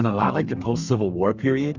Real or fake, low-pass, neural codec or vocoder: fake; 7.2 kHz; codec, 32 kHz, 1.9 kbps, SNAC